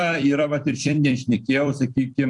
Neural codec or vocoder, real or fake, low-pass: vocoder, 44.1 kHz, 128 mel bands every 256 samples, BigVGAN v2; fake; 10.8 kHz